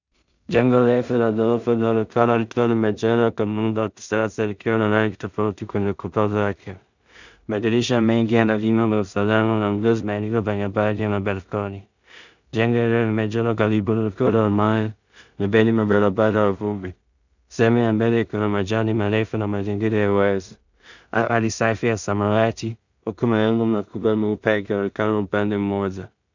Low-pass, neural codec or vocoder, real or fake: 7.2 kHz; codec, 16 kHz in and 24 kHz out, 0.4 kbps, LongCat-Audio-Codec, two codebook decoder; fake